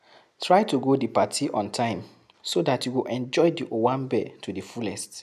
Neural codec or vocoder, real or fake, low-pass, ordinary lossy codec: none; real; 14.4 kHz; none